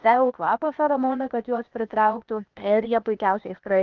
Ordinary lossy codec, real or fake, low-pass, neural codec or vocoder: Opus, 32 kbps; fake; 7.2 kHz; codec, 16 kHz, 0.8 kbps, ZipCodec